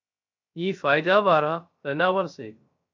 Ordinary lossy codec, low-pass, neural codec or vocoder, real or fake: MP3, 48 kbps; 7.2 kHz; codec, 16 kHz, 0.3 kbps, FocalCodec; fake